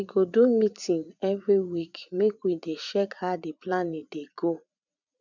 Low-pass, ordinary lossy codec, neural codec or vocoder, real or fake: 7.2 kHz; none; vocoder, 44.1 kHz, 80 mel bands, Vocos; fake